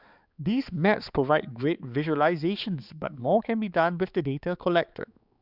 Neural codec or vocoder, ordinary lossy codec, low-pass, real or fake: codec, 16 kHz, 4 kbps, X-Codec, HuBERT features, trained on balanced general audio; Opus, 64 kbps; 5.4 kHz; fake